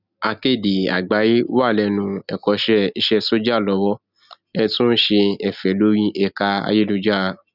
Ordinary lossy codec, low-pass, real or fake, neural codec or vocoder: none; 5.4 kHz; real; none